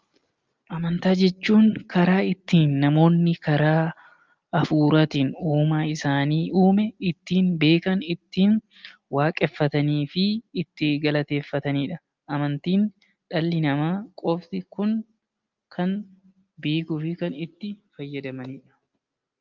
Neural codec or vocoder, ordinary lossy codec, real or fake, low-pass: none; Opus, 32 kbps; real; 7.2 kHz